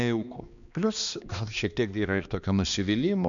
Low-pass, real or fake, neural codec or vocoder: 7.2 kHz; fake; codec, 16 kHz, 2 kbps, X-Codec, HuBERT features, trained on balanced general audio